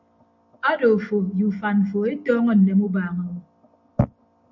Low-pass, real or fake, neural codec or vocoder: 7.2 kHz; real; none